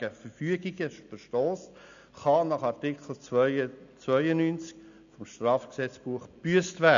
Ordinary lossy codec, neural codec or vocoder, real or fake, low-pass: AAC, 96 kbps; none; real; 7.2 kHz